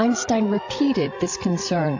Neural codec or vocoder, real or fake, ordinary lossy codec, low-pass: codec, 16 kHz, 16 kbps, FreqCodec, larger model; fake; AAC, 48 kbps; 7.2 kHz